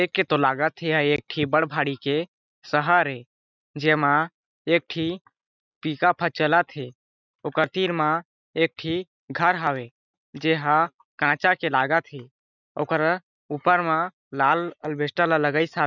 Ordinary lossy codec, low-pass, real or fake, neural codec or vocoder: none; 7.2 kHz; real; none